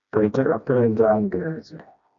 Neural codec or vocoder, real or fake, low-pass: codec, 16 kHz, 1 kbps, FreqCodec, smaller model; fake; 7.2 kHz